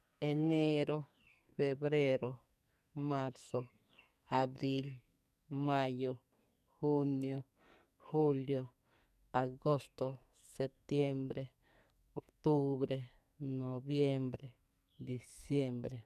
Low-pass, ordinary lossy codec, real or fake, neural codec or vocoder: 14.4 kHz; none; fake; codec, 44.1 kHz, 2.6 kbps, SNAC